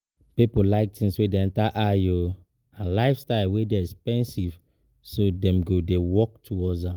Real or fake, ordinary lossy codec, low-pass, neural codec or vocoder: real; Opus, 24 kbps; 19.8 kHz; none